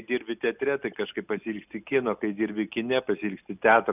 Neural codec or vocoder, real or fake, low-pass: none; real; 3.6 kHz